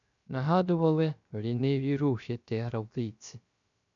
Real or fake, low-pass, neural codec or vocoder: fake; 7.2 kHz; codec, 16 kHz, 0.3 kbps, FocalCodec